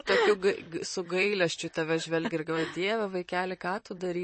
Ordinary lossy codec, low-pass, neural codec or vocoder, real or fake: MP3, 32 kbps; 9.9 kHz; vocoder, 44.1 kHz, 128 mel bands, Pupu-Vocoder; fake